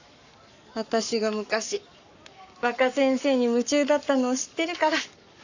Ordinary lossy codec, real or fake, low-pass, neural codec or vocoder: none; fake; 7.2 kHz; codec, 44.1 kHz, 7.8 kbps, Pupu-Codec